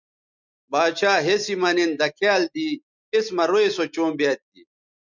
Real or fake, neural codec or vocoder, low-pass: real; none; 7.2 kHz